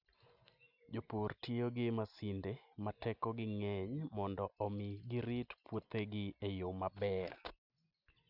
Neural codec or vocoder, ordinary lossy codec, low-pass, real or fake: none; none; 5.4 kHz; real